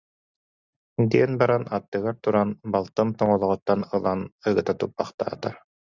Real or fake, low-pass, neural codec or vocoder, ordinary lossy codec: real; 7.2 kHz; none; Opus, 64 kbps